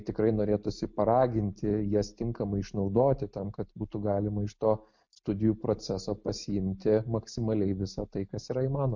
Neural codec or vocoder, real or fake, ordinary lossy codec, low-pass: none; real; MP3, 48 kbps; 7.2 kHz